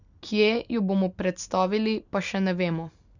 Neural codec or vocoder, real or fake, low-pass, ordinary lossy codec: none; real; 7.2 kHz; none